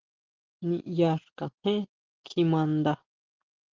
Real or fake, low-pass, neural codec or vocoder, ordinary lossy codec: real; 7.2 kHz; none; Opus, 16 kbps